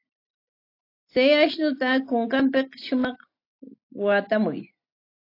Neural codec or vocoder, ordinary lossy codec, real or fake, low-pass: none; AAC, 32 kbps; real; 5.4 kHz